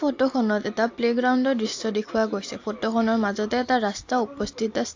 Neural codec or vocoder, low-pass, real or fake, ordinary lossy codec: none; 7.2 kHz; real; AAC, 48 kbps